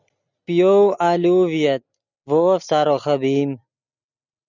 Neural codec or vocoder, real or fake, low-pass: none; real; 7.2 kHz